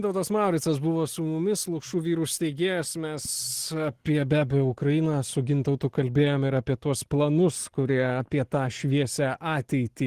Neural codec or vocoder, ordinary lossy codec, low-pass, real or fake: none; Opus, 16 kbps; 14.4 kHz; real